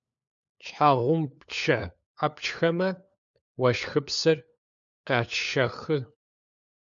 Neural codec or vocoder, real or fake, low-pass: codec, 16 kHz, 4 kbps, FunCodec, trained on LibriTTS, 50 frames a second; fake; 7.2 kHz